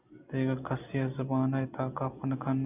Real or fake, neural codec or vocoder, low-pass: real; none; 3.6 kHz